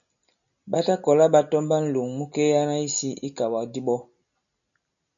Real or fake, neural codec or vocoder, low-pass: real; none; 7.2 kHz